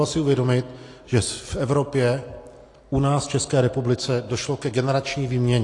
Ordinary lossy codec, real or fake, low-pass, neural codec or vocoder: AAC, 48 kbps; real; 10.8 kHz; none